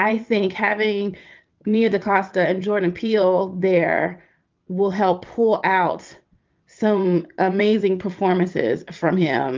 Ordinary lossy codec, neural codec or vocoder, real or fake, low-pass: Opus, 24 kbps; vocoder, 22.05 kHz, 80 mel bands, WaveNeXt; fake; 7.2 kHz